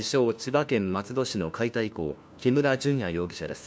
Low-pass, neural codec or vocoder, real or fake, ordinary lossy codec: none; codec, 16 kHz, 1 kbps, FunCodec, trained on LibriTTS, 50 frames a second; fake; none